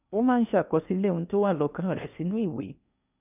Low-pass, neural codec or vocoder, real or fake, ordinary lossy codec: 3.6 kHz; codec, 16 kHz in and 24 kHz out, 0.8 kbps, FocalCodec, streaming, 65536 codes; fake; none